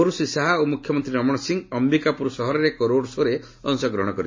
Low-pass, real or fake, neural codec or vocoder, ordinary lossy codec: 7.2 kHz; real; none; MP3, 32 kbps